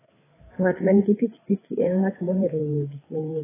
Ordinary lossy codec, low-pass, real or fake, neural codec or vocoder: AAC, 16 kbps; 3.6 kHz; fake; codec, 16 kHz, 4 kbps, FreqCodec, larger model